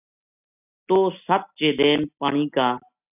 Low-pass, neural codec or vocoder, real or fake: 3.6 kHz; none; real